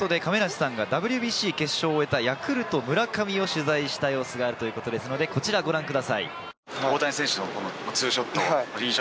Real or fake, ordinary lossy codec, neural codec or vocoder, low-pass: real; none; none; none